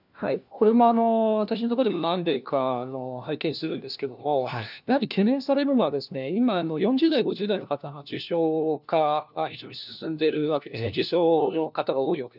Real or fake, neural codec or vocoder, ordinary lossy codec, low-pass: fake; codec, 16 kHz, 1 kbps, FunCodec, trained on LibriTTS, 50 frames a second; none; 5.4 kHz